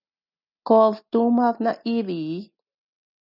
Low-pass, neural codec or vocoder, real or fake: 5.4 kHz; none; real